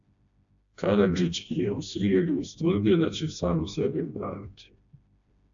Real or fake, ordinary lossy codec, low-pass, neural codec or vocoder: fake; AAC, 64 kbps; 7.2 kHz; codec, 16 kHz, 1 kbps, FreqCodec, smaller model